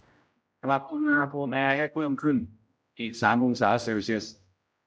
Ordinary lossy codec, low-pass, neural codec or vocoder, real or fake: none; none; codec, 16 kHz, 0.5 kbps, X-Codec, HuBERT features, trained on general audio; fake